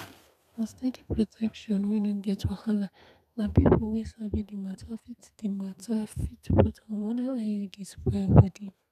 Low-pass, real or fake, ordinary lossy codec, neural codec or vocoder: 14.4 kHz; fake; none; codec, 32 kHz, 1.9 kbps, SNAC